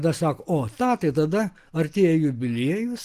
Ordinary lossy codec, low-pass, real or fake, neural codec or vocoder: Opus, 24 kbps; 14.4 kHz; real; none